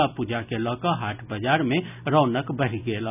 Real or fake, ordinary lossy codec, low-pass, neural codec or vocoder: real; none; 3.6 kHz; none